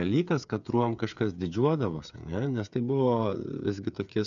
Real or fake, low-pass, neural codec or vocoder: fake; 7.2 kHz; codec, 16 kHz, 8 kbps, FreqCodec, smaller model